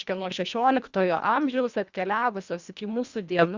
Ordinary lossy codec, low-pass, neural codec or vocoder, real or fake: Opus, 64 kbps; 7.2 kHz; codec, 24 kHz, 1.5 kbps, HILCodec; fake